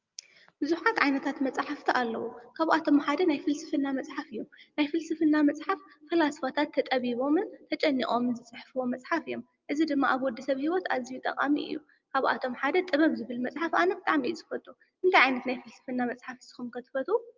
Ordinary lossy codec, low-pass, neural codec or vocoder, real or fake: Opus, 24 kbps; 7.2 kHz; none; real